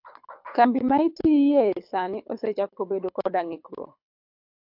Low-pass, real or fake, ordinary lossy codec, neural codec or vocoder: 5.4 kHz; fake; AAC, 48 kbps; codec, 24 kHz, 6 kbps, HILCodec